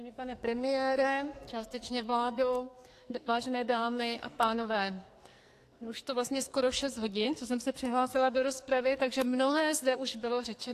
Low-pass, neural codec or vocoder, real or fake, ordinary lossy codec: 14.4 kHz; codec, 44.1 kHz, 2.6 kbps, SNAC; fake; AAC, 64 kbps